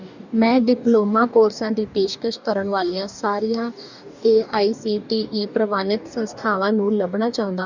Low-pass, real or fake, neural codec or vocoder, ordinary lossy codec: 7.2 kHz; fake; codec, 44.1 kHz, 2.6 kbps, DAC; none